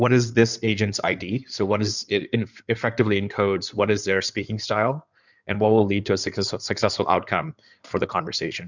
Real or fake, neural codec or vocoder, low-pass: fake; codec, 16 kHz in and 24 kHz out, 2.2 kbps, FireRedTTS-2 codec; 7.2 kHz